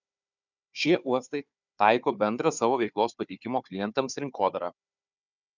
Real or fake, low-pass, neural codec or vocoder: fake; 7.2 kHz; codec, 16 kHz, 4 kbps, FunCodec, trained on Chinese and English, 50 frames a second